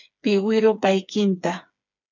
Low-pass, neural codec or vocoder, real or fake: 7.2 kHz; codec, 16 kHz, 4 kbps, FreqCodec, smaller model; fake